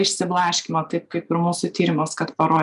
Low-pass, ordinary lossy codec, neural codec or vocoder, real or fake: 10.8 kHz; MP3, 96 kbps; none; real